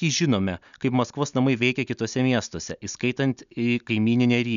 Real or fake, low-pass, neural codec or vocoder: real; 7.2 kHz; none